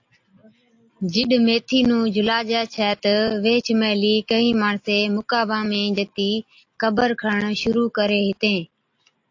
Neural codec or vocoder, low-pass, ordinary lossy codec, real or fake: none; 7.2 kHz; AAC, 48 kbps; real